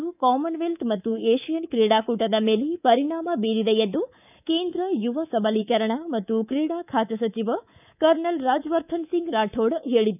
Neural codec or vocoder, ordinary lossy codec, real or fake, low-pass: codec, 24 kHz, 3.1 kbps, DualCodec; none; fake; 3.6 kHz